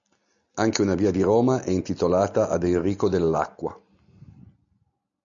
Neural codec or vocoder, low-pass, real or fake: none; 7.2 kHz; real